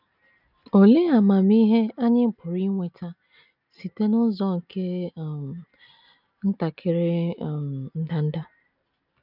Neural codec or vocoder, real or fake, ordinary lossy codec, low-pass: none; real; none; 5.4 kHz